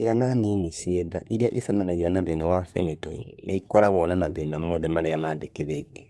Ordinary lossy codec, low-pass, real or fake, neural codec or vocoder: none; none; fake; codec, 24 kHz, 1 kbps, SNAC